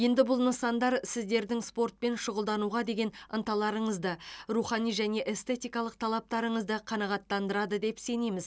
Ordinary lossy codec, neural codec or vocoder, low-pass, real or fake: none; none; none; real